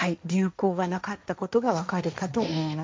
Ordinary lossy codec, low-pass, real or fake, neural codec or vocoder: none; none; fake; codec, 16 kHz, 1.1 kbps, Voila-Tokenizer